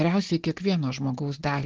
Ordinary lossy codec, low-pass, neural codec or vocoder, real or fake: Opus, 16 kbps; 7.2 kHz; none; real